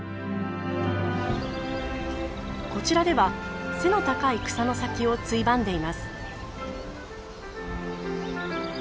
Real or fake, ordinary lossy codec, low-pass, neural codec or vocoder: real; none; none; none